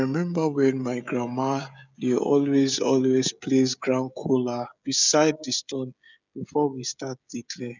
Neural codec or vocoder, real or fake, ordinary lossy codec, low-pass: codec, 16 kHz, 16 kbps, FreqCodec, smaller model; fake; none; 7.2 kHz